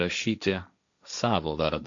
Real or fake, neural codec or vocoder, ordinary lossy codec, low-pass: fake; codec, 16 kHz, 1.1 kbps, Voila-Tokenizer; MP3, 64 kbps; 7.2 kHz